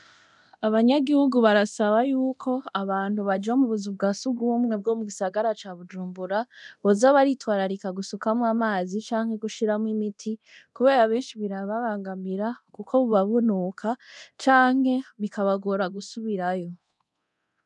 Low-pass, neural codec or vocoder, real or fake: 10.8 kHz; codec, 24 kHz, 0.9 kbps, DualCodec; fake